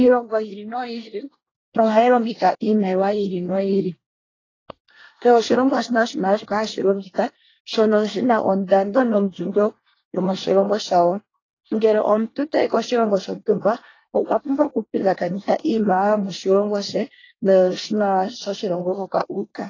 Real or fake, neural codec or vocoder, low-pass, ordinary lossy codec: fake; codec, 24 kHz, 1 kbps, SNAC; 7.2 kHz; AAC, 32 kbps